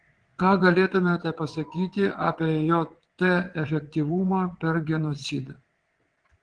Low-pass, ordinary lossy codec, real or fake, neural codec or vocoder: 9.9 kHz; Opus, 16 kbps; fake; vocoder, 22.05 kHz, 80 mel bands, WaveNeXt